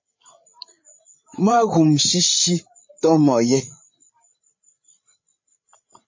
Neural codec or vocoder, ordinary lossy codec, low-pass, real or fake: codec, 16 kHz, 8 kbps, FreqCodec, larger model; MP3, 32 kbps; 7.2 kHz; fake